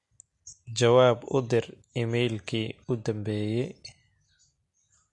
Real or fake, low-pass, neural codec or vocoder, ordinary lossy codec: real; 10.8 kHz; none; MP3, 48 kbps